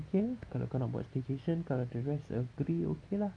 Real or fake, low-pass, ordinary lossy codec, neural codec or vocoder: real; 9.9 kHz; none; none